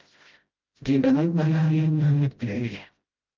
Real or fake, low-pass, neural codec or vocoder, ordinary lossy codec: fake; 7.2 kHz; codec, 16 kHz, 0.5 kbps, FreqCodec, smaller model; Opus, 24 kbps